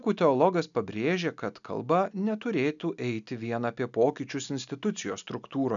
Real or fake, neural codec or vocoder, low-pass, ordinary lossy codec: real; none; 7.2 kHz; MP3, 96 kbps